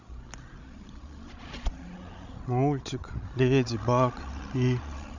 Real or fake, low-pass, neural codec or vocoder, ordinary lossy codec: fake; 7.2 kHz; codec, 16 kHz, 16 kbps, FreqCodec, larger model; none